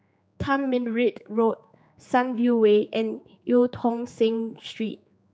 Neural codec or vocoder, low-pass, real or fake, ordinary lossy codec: codec, 16 kHz, 4 kbps, X-Codec, HuBERT features, trained on general audio; none; fake; none